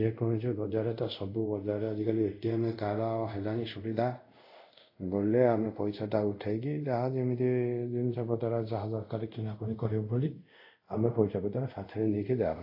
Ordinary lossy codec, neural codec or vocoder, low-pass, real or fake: AAC, 32 kbps; codec, 24 kHz, 0.5 kbps, DualCodec; 5.4 kHz; fake